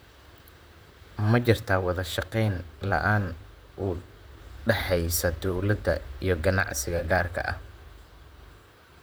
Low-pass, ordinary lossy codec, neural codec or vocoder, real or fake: none; none; vocoder, 44.1 kHz, 128 mel bands, Pupu-Vocoder; fake